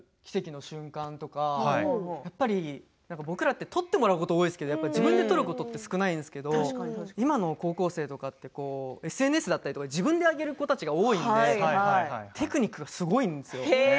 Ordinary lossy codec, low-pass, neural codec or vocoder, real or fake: none; none; none; real